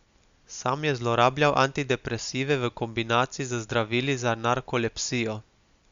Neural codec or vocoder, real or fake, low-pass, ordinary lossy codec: none; real; 7.2 kHz; Opus, 64 kbps